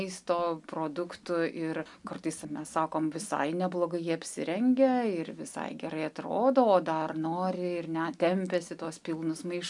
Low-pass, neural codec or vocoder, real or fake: 10.8 kHz; none; real